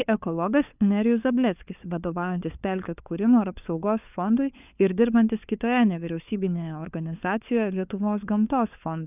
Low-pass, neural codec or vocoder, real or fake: 3.6 kHz; codec, 16 kHz, 4 kbps, FunCodec, trained on LibriTTS, 50 frames a second; fake